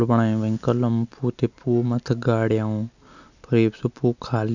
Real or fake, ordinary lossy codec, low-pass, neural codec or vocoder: real; none; 7.2 kHz; none